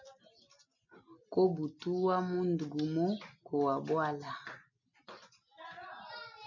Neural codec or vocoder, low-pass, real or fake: none; 7.2 kHz; real